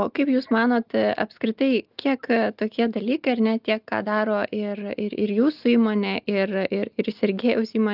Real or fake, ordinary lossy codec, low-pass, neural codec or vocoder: real; Opus, 32 kbps; 5.4 kHz; none